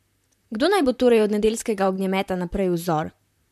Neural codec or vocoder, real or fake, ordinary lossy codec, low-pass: vocoder, 44.1 kHz, 128 mel bands, Pupu-Vocoder; fake; MP3, 96 kbps; 14.4 kHz